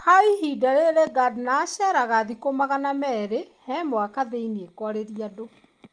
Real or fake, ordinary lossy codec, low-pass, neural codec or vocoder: real; Opus, 32 kbps; 9.9 kHz; none